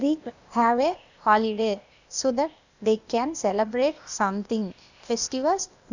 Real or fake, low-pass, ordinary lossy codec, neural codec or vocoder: fake; 7.2 kHz; none; codec, 16 kHz, 0.8 kbps, ZipCodec